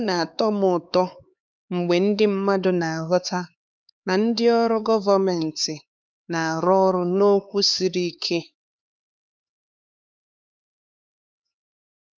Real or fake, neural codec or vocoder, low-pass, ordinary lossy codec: fake; codec, 16 kHz, 4 kbps, X-Codec, HuBERT features, trained on LibriSpeech; none; none